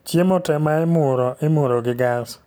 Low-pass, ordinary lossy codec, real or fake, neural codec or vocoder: none; none; real; none